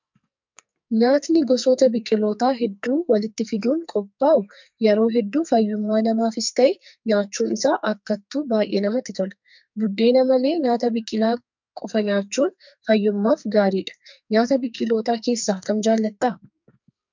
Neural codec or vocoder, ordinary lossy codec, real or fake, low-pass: codec, 44.1 kHz, 2.6 kbps, SNAC; MP3, 64 kbps; fake; 7.2 kHz